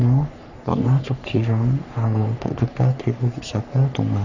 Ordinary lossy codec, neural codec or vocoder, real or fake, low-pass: none; codec, 44.1 kHz, 3.4 kbps, Pupu-Codec; fake; 7.2 kHz